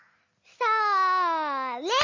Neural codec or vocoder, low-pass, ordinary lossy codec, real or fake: none; 7.2 kHz; MP3, 32 kbps; real